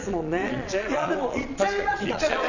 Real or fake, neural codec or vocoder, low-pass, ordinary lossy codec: fake; vocoder, 22.05 kHz, 80 mel bands, Vocos; 7.2 kHz; none